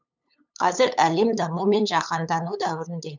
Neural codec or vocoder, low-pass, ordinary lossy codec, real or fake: codec, 16 kHz, 8 kbps, FunCodec, trained on LibriTTS, 25 frames a second; 7.2 kHz; none; fake